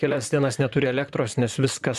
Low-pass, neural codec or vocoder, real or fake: 14.4 kHz; vocoder, 44.1 kHz, 128 mel bands, Pupu-Vocoder; fake